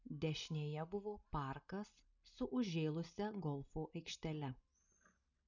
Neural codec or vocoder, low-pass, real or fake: none; 7.2 kHz; real